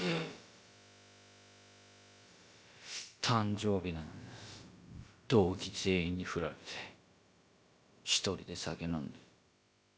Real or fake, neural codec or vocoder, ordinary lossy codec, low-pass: fake; codec, 16 kHz, about 1 kbps, DyCAST, with the encoder's durations; none; none